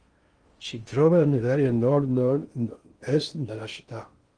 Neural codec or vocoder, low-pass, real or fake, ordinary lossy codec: codec, 16 kHz in and 24 kHz out, 0.6 kbps, FocalCodec, streaming, 2048 codes; 9.9 kHz; fake; Opus, 24 kbps